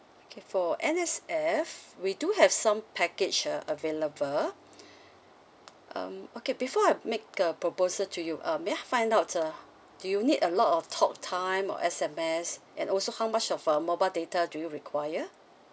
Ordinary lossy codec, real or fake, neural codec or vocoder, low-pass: none; real; none; none